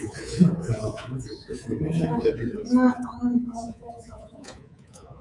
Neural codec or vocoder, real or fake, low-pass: codec, 24 kHz, 3.1 kbps, DualCodec; fake; 10.8 kHz